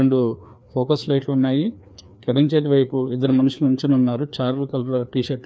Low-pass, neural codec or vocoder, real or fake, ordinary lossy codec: none; codec, 16 kHz, 2 kbps, FreqCodec, larger model; fake; none